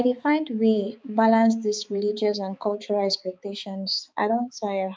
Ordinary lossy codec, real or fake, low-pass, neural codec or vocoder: none; fake; none; codec, 16 kHz, 4 kbps, X-Codec, HuBERT features, trained on balanced general audio